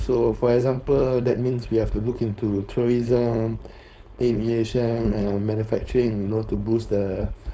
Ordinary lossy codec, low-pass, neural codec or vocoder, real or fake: none; none; codec, 16 kHz, 4.8 kbps, FACodec; fake